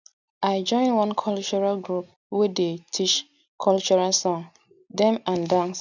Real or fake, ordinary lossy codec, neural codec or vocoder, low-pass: real; none; none; 7.2 kHz